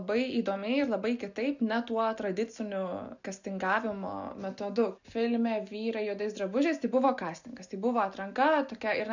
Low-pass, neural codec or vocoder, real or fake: 7.2 kHz; none; real